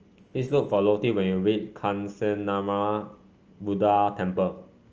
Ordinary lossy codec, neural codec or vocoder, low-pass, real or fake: Opus, 24 kbps; none; 7.2 kHz; real